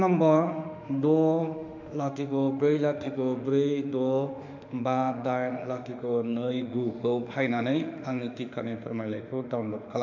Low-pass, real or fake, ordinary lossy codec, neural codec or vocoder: 7.2 kHz; fake; none; autoencoder, 48 kHz, 32 numbers a frame, DAC-VAE, trained on Japanese speech